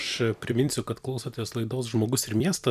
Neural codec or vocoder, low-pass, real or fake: vocoder, 44.1 kHz, 128 mel bands every 256 samples, BigVGAN v2; 14.4 kHz; fake